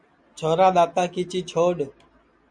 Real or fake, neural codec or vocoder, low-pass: real; none; 9.9 kHz